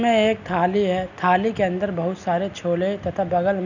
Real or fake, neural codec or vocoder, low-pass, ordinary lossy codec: real; none; 7.2 kHz; none